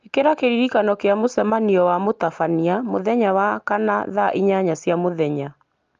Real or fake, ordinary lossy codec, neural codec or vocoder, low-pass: real; Opus, 32 kbps; none; 7.2 kHz